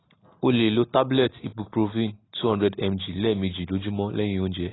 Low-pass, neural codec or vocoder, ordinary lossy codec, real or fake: 7.2 kHz; none; AAC, 16 kbps; real